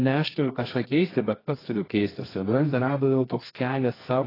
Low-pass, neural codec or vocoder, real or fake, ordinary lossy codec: 5.4 kHz; codec, 24 kHz, 0.9 kbps, WavTokenizer, medium music audio release; fake; AAC, 24 kbps